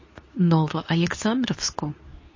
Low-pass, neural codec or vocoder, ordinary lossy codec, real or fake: 7.2 kHz; codec, 24 kHz, 0.9 kbps, WavTokenizer, medium speech release version 2; MP3, 32 kbps; fake